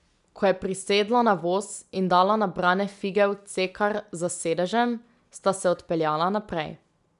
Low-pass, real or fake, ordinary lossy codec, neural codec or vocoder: 10.8 kHz; real; none; none